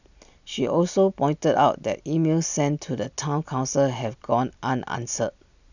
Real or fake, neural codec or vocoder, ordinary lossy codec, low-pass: real; none; none; 7.2 kHz